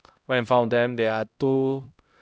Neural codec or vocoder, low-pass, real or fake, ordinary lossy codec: codec, 16 kHz, 0.5 kbps, X-Codec, HuBERT features, trained on LibriSpeech; none; fake; none